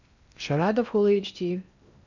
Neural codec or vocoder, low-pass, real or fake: codec, 16 kHz in and 24 kHz out, 0.8 kbps, FocalCodec, streaming, 65536 codes; 7.2 kHz; fake